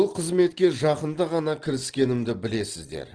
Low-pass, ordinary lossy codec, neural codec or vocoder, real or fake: 9.9 kHz; Opus, 16 kbps; none; real